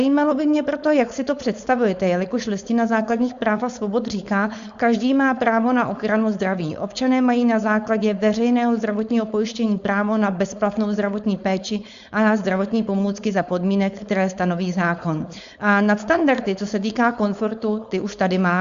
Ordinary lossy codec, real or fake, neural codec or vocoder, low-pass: Opus, 64 kbps; fake; codec, 16 kHz, 4.8 kbps, FACodec; 7.2 kHz